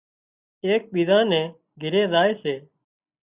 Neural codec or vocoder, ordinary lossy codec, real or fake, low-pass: none; Opus, 64 kbps; real; 3.6 kHz